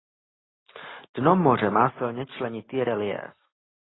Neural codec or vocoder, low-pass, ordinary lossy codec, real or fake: none; 7.2 kHz; AAC, 16 kbps; real